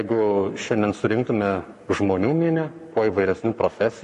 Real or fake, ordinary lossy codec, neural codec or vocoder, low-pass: fake; MP3, 48 kbps; codec, 44.1 kHz, 7.8 kbps, Pupu-Codec; 14.4 kHz